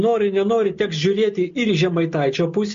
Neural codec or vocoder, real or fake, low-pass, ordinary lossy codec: none; real; 7.2 kHz; MP3, 48 kbps